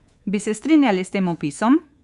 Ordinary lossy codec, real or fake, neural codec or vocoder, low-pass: Opus, 64 kbps; fake; codec, 24 kHz, 3.1 kbps, DualCodec; 10.8 kHz